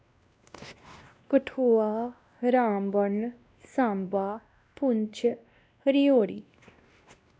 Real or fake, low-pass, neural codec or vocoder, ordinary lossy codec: fake; none; codec, 16 kHz, 1 kbps, X-Codec, WavLM features, trained on Multilingual LibriSpeech; none